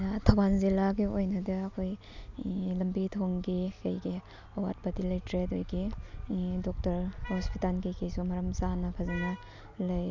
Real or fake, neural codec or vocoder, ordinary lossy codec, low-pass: real; none; none; 7.2 kHz